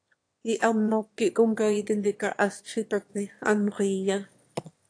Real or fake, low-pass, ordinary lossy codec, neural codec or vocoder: fake; 9.9 kHz; AAC, 48 kbps; autoencoder, 22.05 kHz, a latent of 192 numbers a frame, VITS, trained on one speaker